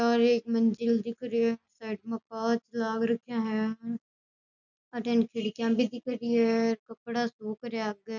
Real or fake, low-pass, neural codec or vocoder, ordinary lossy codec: real; 7.2 kHz; none; none